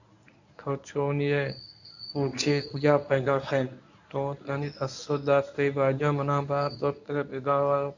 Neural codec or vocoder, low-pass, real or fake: codec, 24 kHz, 0.9 kbps, WavTokenizer, medium speech release version 1; 7.2 kHz; fake